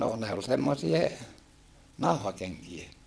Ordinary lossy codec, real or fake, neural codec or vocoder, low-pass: none; fake; vocoder, 22.05 kHz, 80 mel bands, WaveNeXt; none